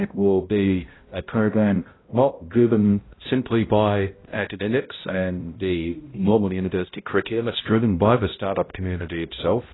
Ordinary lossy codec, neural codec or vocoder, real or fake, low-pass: AAC, 16 kbps; codec, 16 kHz, 0.5 kbps, X-Codec, HuBERT features, trained on balanced general audio; fake; 7.2 kHz